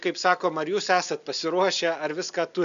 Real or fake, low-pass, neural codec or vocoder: real; 7.2 kHz; none